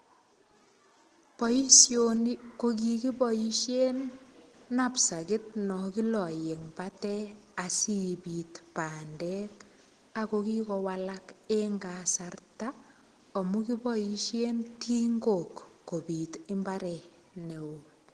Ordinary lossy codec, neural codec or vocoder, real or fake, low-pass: Opus, 16 kbps; none; real; 9.9 kHz